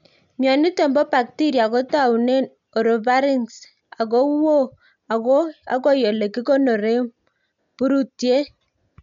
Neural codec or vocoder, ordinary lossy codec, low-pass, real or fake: none; MP3, 64 kbps; 7.2 kHz; real